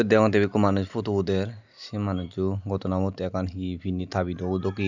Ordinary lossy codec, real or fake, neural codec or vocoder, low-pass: none; real; none; 7.2 kHz